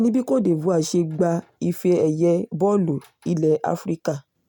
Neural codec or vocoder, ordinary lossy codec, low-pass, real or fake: none; none; none; real